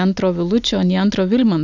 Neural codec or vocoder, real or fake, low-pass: none; real; 7.2 kHz